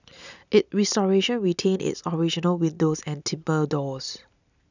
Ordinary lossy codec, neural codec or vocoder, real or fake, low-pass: none; none; real; 7.2 kHz